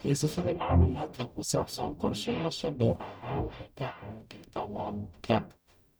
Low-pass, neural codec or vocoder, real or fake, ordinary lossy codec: none; codec, 44.1 kHz, 0.9 kbps, DAC; fake; none